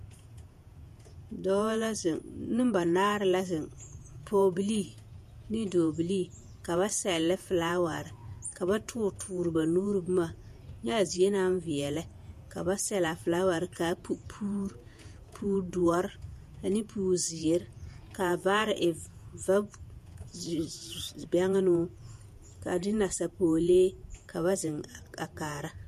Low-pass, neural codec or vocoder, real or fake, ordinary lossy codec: 14.4 kHz; vocoder, 48 kHz, 128 mel bands, Vocos; fake; MP3, 64 kbps